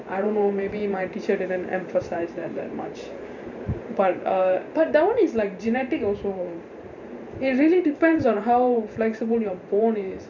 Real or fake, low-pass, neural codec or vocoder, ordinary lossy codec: fake; 7.2 kHz; vocoder, 44.1 kHz, 128 mel bands every 512 samples, BigVGAN v2; none